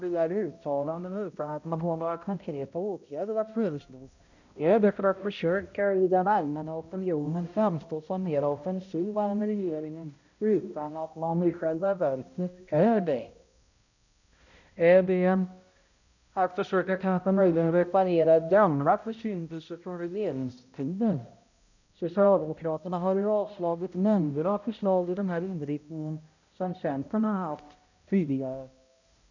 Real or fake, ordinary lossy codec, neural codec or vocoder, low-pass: fake; none; codec, 16 kHz, 0.5 kbps, X-Codec, HuBERT features, trained on balanced general audio; 7.2 kHz